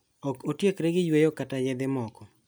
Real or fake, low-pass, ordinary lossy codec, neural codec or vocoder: real; none; none; none